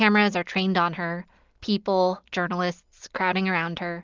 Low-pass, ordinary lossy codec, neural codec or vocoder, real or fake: 7.2 kHz; Opus, 32 kbps; none; real